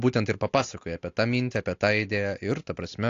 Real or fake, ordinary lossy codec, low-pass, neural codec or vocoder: real; AAC, 48 kbps; 7.2 kHz; none